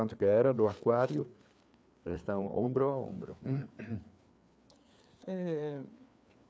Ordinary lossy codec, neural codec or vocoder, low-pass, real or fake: none; codec, 16 kHz, 4 kbps, FreqCodec, larger model; none; fake